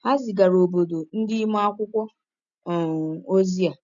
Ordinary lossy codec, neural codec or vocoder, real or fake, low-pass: none; none; real; 7.2 kHz